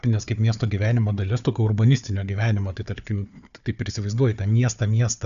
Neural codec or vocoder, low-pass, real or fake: codec, 16 kHz, 4 kbps, FunCodec, trained on Chinese and English, 50 frames a second; 7.2 kHz; fake